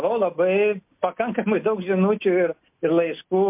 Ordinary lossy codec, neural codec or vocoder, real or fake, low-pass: MP3, 32 kbps; none; real; 3.6 kHz